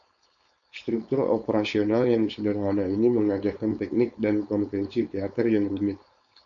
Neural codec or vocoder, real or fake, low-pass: codec, 16 kHz, 4.8 kbps, FACodec; fake; 7.2 kHz